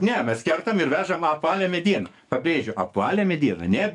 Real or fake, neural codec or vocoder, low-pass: fake; codec, 44.1 kHz, 7.8 kbps, DAC; 10.8 kHz